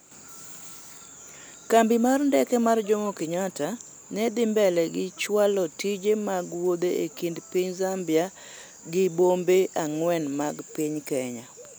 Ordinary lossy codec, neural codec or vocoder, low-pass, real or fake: none; none; none; real